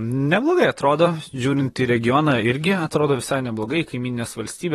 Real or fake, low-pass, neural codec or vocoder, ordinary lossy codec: fake; 19.8 kHz; vocoder, 44.1 kHz, 128 mel bands, Pupu-Vocoder; AAC, 32 kbps